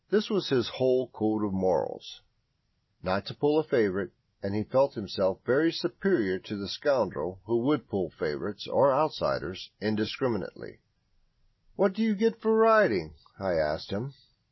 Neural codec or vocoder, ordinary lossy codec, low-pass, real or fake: none; MP3, 24 kbps; 7.2 kHz; real